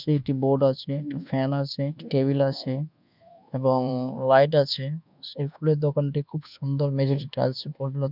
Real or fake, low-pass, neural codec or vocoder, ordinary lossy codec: fake; 5.4 kHz; codec, 24 kHz, 1.2 kbps, DualCodec; none